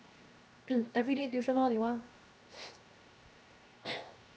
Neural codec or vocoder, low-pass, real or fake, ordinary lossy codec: codec, 16 kHz, 0.7 kbps, FocalCodec; none; fake; none